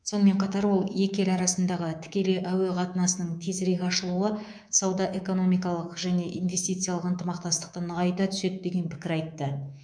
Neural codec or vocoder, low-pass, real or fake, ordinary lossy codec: codec, 24 kHz, 3.1 kbps, DualCodec; 9.9 kHz; fake; none